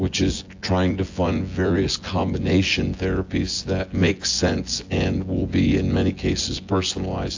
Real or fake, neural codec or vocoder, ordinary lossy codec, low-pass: fake; vocoder, 24 kHz, 100 mel bands, Vocos; AAC, 48 kbps; 7.2 kHz